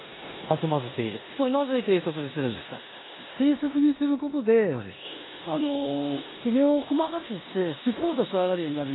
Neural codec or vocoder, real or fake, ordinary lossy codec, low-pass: codec, 16 kHz in and 24 kHz out, 0.9 kbps, LongCat-Audio-Codec, four codebook decoder; fake; AAC, 16 kbps; 7.2 kHz